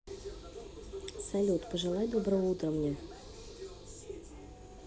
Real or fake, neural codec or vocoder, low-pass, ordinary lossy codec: real; none; none; none